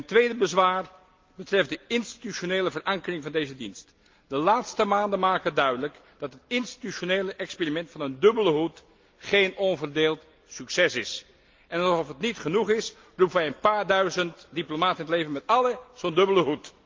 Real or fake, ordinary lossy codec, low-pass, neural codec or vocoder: real; Opus, 24 kbps; 7.2 kHz; none